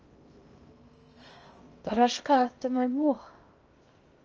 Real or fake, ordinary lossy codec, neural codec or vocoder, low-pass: fake; Opus, 24 kbps; codec, 16 kHz in and 24 kHz out, 0.6 kbps, FocalCodec, streaming, 2048 codes; 7.2 kHz